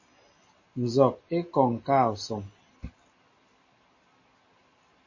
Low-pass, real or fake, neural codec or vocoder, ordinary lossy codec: 7.2 kHz; real; none; MP3, 32 kbps